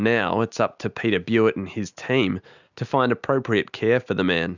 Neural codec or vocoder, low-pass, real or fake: none; 7.2 kHz; real